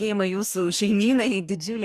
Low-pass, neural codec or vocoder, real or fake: 14.4 kHz; codec, 44.1 kHz, 2.6 kbps, DAC; fake